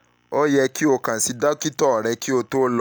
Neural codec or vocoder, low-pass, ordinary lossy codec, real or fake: none; none; none; real